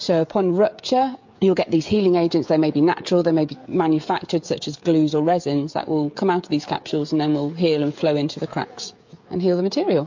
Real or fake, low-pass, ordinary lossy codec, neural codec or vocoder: fake; 7.2 kHz; MP3, 48 kbps; codec, 16 kHz, 16 kbps, FreqCodec, smaller model